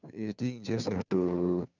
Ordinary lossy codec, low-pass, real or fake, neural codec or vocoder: none; 7.2 kHz; fake; codec, 16 kHz, 2 kbps, FunCodec, trained on Chinese and English, 25 frames a second